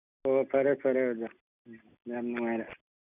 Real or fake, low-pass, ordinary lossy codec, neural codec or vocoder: real; 3.6 kHz; none; none